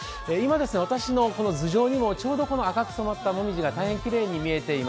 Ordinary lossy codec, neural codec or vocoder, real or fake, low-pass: none; none; real; none